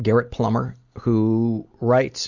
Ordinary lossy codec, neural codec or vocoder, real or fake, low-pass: Opus, 64 kbps; none; real; 7.2 kHz